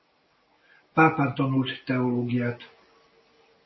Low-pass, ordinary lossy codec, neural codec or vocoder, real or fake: 7.2 kHz; MP3, 24 kbps; none; real